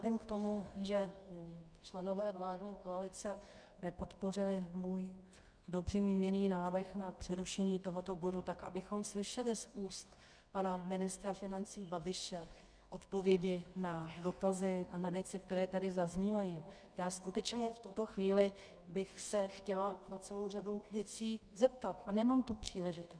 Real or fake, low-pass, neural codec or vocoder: fake; 9.9 kHz; codec, 24 kHz, 0.9 kbps, WavTokenizer, medium music audio release